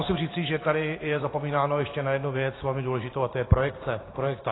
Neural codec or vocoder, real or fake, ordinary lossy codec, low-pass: vocoder, 44.1 kHz, 128 mel bands every 512 samples, BigVGAN v2; fake; AAC, 16 kbps; 7.2 kHz